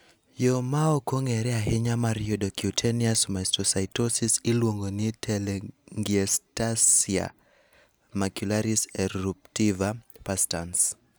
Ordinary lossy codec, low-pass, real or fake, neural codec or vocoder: none; none; real; none